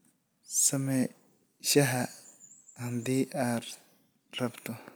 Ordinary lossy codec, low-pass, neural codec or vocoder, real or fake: none; none; none; real